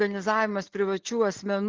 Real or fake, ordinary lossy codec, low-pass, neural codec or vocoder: real; Opus, 16 kbps; 7.2 kHz; none